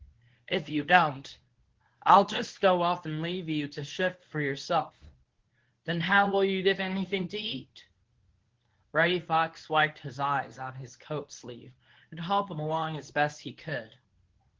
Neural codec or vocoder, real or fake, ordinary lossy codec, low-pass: codec, 24 kHz, 0.9 kbps, WavTokenizer, medium speech release version 1; fake; Opus, 16 kbps; 7.2 kHz